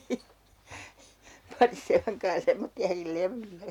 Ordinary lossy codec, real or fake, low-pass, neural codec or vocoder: none; real; 19.8 kHz; none